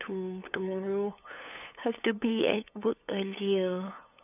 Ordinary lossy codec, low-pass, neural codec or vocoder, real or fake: none; 3.6 kHz; codec, 16 kHz, 8 kbps, FunCodec, trained on LibriTTS, 25 frames a second; fake